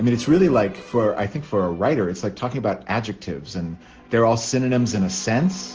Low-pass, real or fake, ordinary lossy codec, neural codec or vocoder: 7.2 kHz; real; Opus, 16 kbps; none